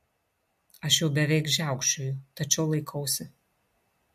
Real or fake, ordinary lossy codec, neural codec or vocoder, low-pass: real; MP3, 64 kbps; none; 14.4 kHz